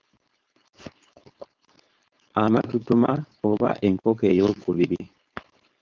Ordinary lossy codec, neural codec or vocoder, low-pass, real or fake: Opus, 24 kbps; codec, 16 kHz, 4.8 kbps, FACodec; 7.2 kHz; fake